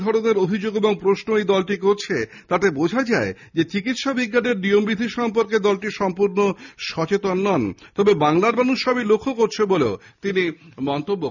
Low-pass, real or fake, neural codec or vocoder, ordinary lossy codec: 7.2 kHz; real; none; none